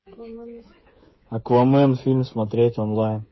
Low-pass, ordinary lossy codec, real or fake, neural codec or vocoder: 7.2 kHz; MP3, 24 kbps; fake; codec, 16 kHz, 16 kbps, FreqCodec, smaller model